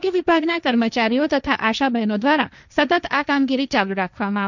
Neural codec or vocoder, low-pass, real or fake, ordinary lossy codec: codec, 16 kHz, 1.1 kbps, Voila-Tokenizer; 7.2 kHz; fake; none